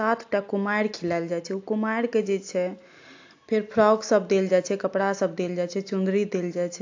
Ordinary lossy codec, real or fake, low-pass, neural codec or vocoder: AAC, 48 kbps; real; 7.2 kHz; none